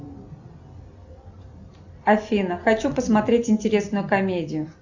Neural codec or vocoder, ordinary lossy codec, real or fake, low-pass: none; Opus, 64 kbps; real; 7.2 kHz